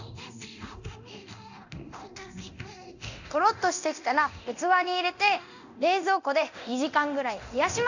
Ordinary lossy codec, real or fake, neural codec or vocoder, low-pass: none; fake; codec, 24 kHz, 0.9 kbps, DualCodec; 7.2 kHz